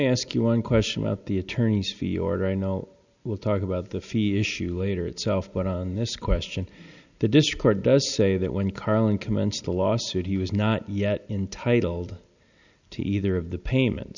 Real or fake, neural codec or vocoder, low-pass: real; none; 7.2 kHz